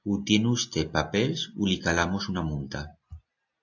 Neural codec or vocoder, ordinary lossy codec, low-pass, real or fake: none; AAC, 48 kbps; 7.2 kHz; real